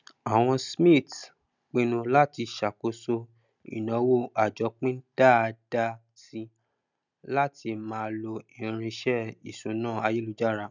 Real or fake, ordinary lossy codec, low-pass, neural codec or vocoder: real; none; 7.2 kHz; none